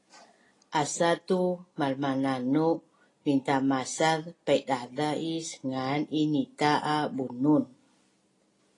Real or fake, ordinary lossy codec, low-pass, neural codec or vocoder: real; AAC, 32 kbps; 10.8 kHz; none